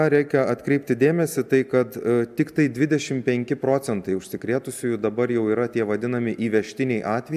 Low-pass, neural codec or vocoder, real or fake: 14.4 kHz; none; real